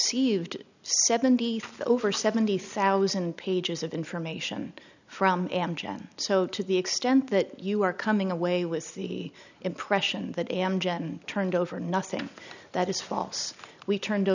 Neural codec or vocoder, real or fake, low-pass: none; real; 7.2 kHz